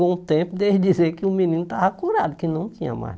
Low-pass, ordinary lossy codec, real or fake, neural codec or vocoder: none; none; real; none